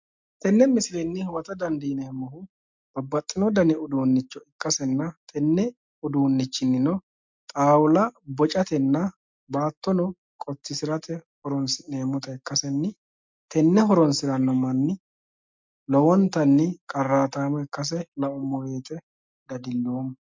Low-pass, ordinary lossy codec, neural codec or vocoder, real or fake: 7.2 kHz; MP3, 64 kbps; none; real